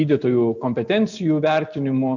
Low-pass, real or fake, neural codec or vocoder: 7.2 kHz; real; none